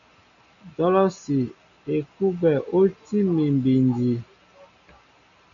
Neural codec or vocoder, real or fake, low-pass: none; real; 7.2 kHz